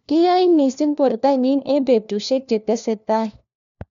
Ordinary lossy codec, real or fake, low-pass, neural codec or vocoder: none; fake; 7.2 kHz; codec, 16 kHz, 1 kbps, FunCodec, trained on LibriTTS, 50 frames a second